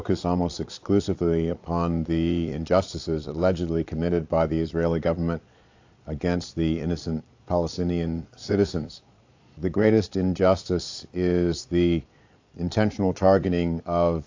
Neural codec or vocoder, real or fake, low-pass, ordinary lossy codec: vocoder, 22.05 kHz, 80 mel bands, Vocos; fake; 7.2 kHz; AAC, 48 kbps